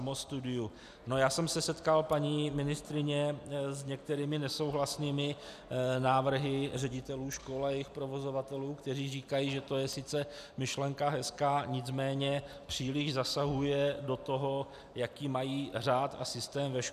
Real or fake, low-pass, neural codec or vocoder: real; 14.4 kHz; none